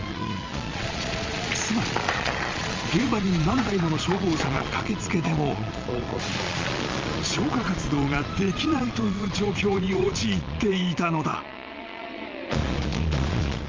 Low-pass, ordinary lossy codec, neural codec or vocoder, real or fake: 7.2 kHz; Opus, 32 kbps; vocoder, 22.05 kHz, 80 mel bands, Vocos; fake